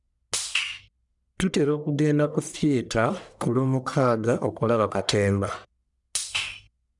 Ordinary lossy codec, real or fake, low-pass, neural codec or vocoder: none; fake; 10.8 kHz; codec, 44.1 kHz, 1.7 kbps, Pupu-Codec